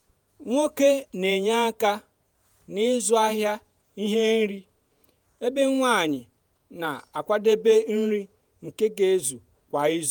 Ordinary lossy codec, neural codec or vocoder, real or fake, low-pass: none; vocoder, 48 kHz, 128 mel bands, Vocos; fake; none